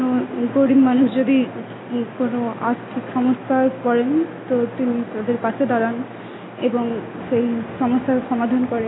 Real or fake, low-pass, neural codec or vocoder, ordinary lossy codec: real; 7.2 kHz; none; AAC, 16 kbps